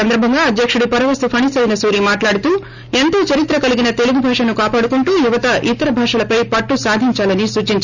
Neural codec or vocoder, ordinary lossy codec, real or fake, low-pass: none; none; real; 7.2 kHz